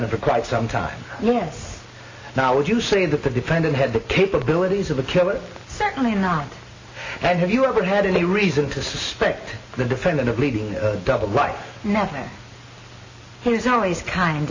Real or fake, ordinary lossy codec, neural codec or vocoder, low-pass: real; AAC, 32 kbps; none; 7.2 kHz